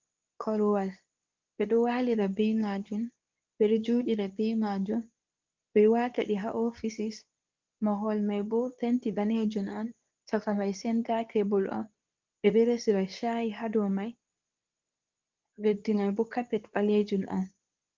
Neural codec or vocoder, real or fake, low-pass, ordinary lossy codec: codec, 24 kHz, 0.9 kbps, WavTokenizer, medium speech release version 2; fake; 7.2 kHz; Opus, 24 kbps